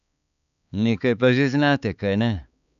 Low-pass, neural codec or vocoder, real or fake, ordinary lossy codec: 7.2 kHz; codec, 16 kHz, 4 kbps, X-Codec, HuBERT features, trained on balanced general audio; fake; none